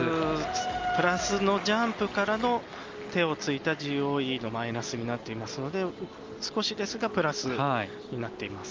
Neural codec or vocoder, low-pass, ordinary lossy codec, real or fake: none; 7.2 kHz; Opus, 32 kbps; real